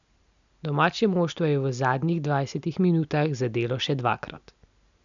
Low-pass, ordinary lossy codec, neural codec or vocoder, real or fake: 7.2 kHz; none; none; real